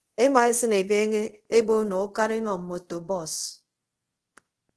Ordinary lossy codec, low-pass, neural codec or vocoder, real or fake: Opus, 16 kbps; 10.8 kHz; codec, 24 kHz, 0.5 kbps, DualCodec; fake